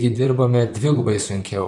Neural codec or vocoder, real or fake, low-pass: vocoder, 44.1 kHz, 128 mel bands, Pupu-Vocoder; fake; 10.8 kHz